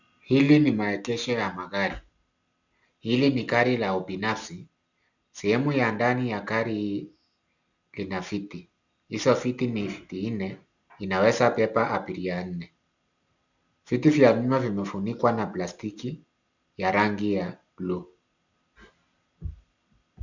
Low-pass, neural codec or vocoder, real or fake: 7.2 kHz; none; real